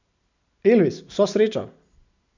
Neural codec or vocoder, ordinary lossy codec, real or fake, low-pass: none; none; real; 7.2 kHz